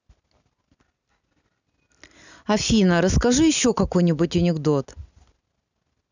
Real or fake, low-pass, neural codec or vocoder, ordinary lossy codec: real; 7.2 kHz; none; none